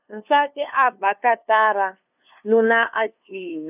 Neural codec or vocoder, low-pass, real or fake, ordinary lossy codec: codec, 16 kHz, 2 kbps, FunCodec, trained on LibriTTS, 25 frames a second; 3.6 kHz; fake; none